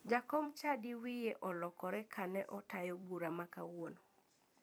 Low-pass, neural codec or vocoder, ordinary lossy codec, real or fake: none; vocoder, 44.1 kHz, 128 mel bands every 256 samples, BigVGAN v2; none; fake